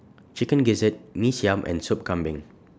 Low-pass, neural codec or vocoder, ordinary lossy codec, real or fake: none; none; none; real